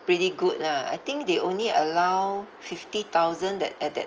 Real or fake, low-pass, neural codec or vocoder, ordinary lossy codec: real; 7.2 kHz; none; Opus, 24 kbps